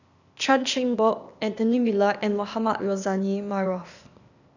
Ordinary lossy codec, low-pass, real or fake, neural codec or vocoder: none; 7.2 kHz; fake; codec, 16 kHz, 0.8 kbps, ZipCodec